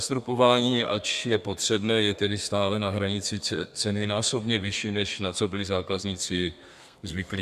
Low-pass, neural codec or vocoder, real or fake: 14.4 kHz; codec, 32 kHz, 1.9 kbps, SNAC; fake